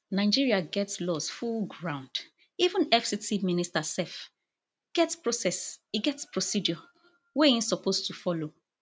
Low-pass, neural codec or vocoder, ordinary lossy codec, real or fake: none; none; none; real